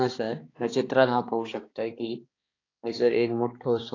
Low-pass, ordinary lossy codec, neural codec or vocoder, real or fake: 7.2 kHz; AAC, 32 kbps; codec, 16 kHz, 2 kbps, X-Codec, HuBERT features, trained on balanced general audio; fake